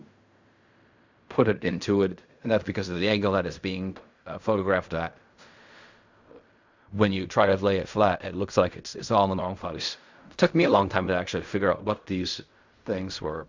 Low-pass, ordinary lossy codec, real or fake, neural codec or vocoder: 7.2 kHz; Opus, 64 kbps; fake; codec, 16 kHz in and 24 kHz out, 0.4 kbps, LongCat-Audio-Codec, fine tuned four codebook decoder